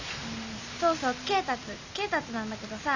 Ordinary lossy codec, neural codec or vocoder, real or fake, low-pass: none; none; real; 7.2 kHz